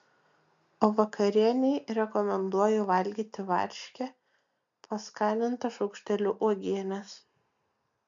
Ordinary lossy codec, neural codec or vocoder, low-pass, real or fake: MP3, 64 kbps; none; 7.2 kHz; real